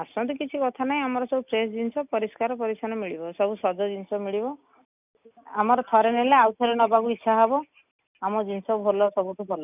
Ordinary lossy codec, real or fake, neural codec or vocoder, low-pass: none; real; none; 3.6 kHz